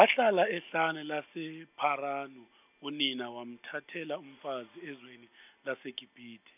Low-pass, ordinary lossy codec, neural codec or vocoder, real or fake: 3.6 kHz; none; none; real